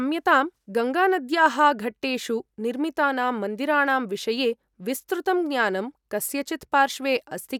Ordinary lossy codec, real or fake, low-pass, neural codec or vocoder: none; real; 19.8 kHz; none